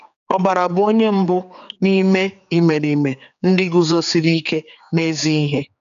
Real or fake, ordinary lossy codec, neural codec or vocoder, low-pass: fake; none; codec, 16 kHz, 4 kbps, X-Codec, HuBERT features, trained on general audio; 7.2 kHz